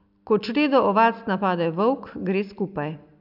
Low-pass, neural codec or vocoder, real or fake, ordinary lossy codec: 5.4 kHz; none; real; none